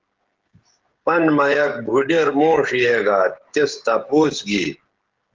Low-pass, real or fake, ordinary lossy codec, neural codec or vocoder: 7.2 kHz; fake; Opus, 16 kbps; codec, 16 kHz, 16 kbps, FreqCodec, smaller model